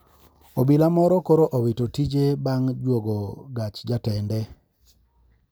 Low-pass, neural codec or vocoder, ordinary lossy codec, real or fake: none; none; none; real